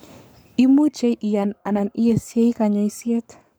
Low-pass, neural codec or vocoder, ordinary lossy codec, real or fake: none; codec, 44.1 kHz, 7.8 kbps, Pupu-Codec; none; fake